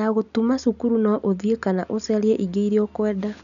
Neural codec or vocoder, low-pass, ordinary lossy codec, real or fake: none; 7.2 kHz; none; real